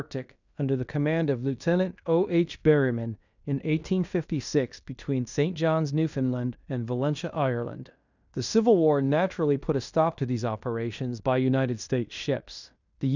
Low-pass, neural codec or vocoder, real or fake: 7.2 kHz; codec, 16 kHz in and 24 kHz out, 0.9 kbps, LongCat-Audio-Codec, fine tuned four codebook decoder; fake